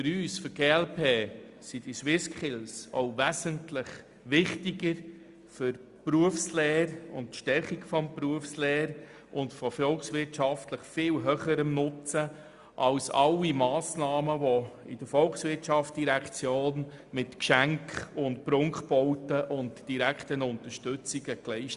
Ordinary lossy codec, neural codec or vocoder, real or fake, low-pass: Opus, 64 kbps; none; real; 10.8 kHz